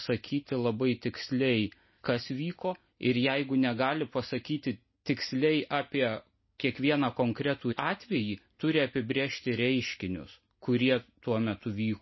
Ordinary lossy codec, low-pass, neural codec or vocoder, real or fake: MP3, 24 kbps; 7.2 kHz; none; real